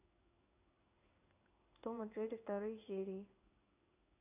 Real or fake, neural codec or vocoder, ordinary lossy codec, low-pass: fake; codec, 16 kHz in and 24 kHz out, 1 kbps, XY-Tokenizer; none; 3.6 kHz